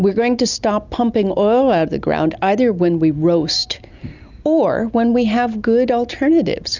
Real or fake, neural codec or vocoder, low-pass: real; none; 7.2 kHz